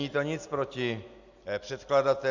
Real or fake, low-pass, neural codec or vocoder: real; 7.2 kHz; none